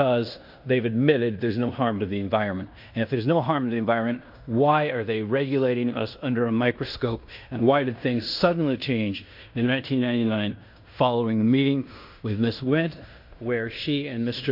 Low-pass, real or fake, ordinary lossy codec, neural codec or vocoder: 5.4 kHz; fake; MP3, 48 kbps; codec, 16 kHz in and 24 kHz out, 0.9 kbps, LongCat-Audio-Codec, fine tuned four codebook decoder